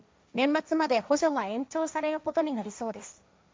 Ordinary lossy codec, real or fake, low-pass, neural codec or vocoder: none; fake; none; codec, 16 kHz, 1.1 kbps, Voila-Tokenizer